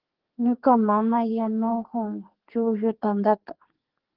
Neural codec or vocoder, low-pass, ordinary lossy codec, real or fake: codec, 32 kHz, 1.9 kbps, SNAC; 5.4 kHz; Opus, 16 kbps; fake